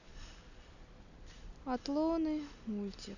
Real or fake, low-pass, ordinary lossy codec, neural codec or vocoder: real; 7.2 kHz; none; none